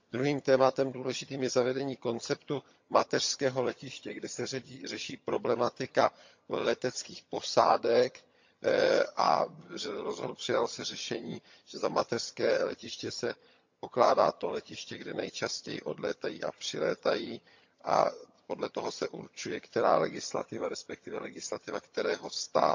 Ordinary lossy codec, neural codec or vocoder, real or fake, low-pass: MP3, 64 kbps; vocoder, 22.05 kHz, 80 mel bands, HiFi-GAN; fake; 7.2 kHz